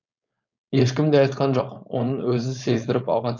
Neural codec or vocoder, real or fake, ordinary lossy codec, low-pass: codec, 16 kHz, 4.8 kbps, FACodec; fake; none; 7.2 kHz